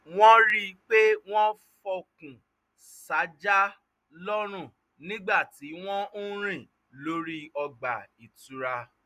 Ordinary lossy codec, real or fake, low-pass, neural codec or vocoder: none; real; 14.4 kHz; none